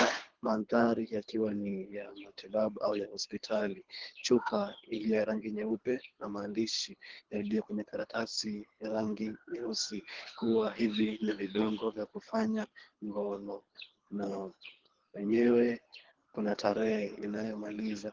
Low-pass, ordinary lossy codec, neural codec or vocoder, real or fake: 7.2 kHz; Opus, 16 kbps; codec, 24 kHz, 3 kbps, HILCodec; fake